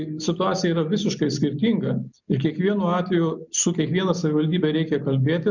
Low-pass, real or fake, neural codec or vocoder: 7.2 kHz; real; none